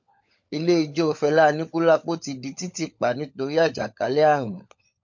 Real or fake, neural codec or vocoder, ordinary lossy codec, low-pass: fake; codec, 16 kHz, 16 kbps, FunCodec, trained on LibriTTS, 50 frames a second; MP3, 48 kbps; 7.2 kHz